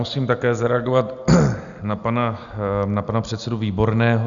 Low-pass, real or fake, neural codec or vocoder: 7.2 kHz; real; none